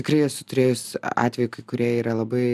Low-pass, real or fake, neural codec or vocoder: 14.4 kHz; real; none